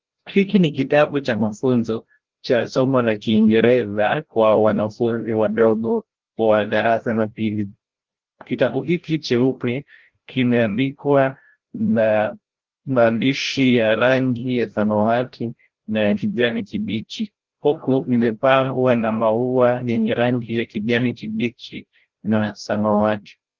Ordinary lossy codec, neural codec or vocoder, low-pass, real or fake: Opus, 16 kbps; codec, 16 kHz, 0.5 kbps, FreqCodec, larger model; 7.2 kHz; fake